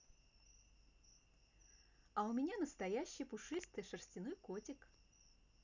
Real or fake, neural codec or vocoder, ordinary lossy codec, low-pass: real; none; none; 7.2 kHz